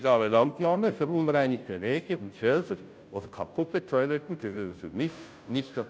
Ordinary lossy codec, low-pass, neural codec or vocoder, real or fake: none; none; codec, 16 kHz, 0.5 kbps, FunCodec, trained on Chinese and English, 25 frames a second; fake